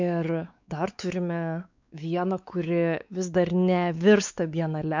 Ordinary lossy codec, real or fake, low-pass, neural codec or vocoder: MP3, 64 kbps; fake; 7.2 kHz; codec, 16 kHz, 4 kbps, X-Codec, WavLM features, trained on Multilingual LibriSpeech